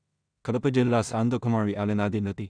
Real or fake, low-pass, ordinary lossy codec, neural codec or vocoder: fake; 9.9 kHz; none; codec, 16 kHz in and 24 kHz out, 0.4 kbps, LongCat-Audio-Codec, two codebook decoder